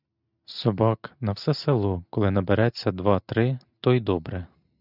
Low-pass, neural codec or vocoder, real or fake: 5.4 kHz; none; real